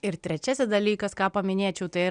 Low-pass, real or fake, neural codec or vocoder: 9.9 kHz; real; none